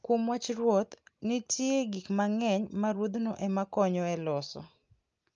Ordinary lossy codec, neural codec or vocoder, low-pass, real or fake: Opus, 24 kbps; none; 7.2 kHz; real